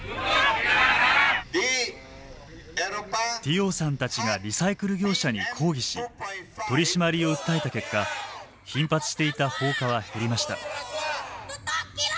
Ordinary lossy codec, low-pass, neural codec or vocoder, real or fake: none; none; none; real